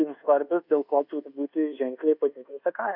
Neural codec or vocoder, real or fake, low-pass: codec, 24 kHz, 1.2 kbps, DualCodec; fake; 5.4 kHz